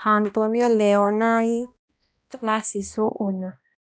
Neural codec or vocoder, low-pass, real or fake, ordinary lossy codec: codec, 16 kHz, 1 kbps, X-Codec, HuBERT features, trained on balanced general audio; none; fake; none